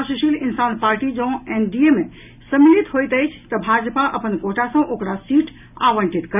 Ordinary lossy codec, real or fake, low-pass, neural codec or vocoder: none; real; 3.6 kHz; none